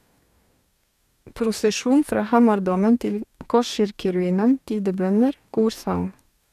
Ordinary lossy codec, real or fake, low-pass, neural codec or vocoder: none; fake; 14.4 kHz; codec, 44.1 kHz, 2.6 kbps, DAC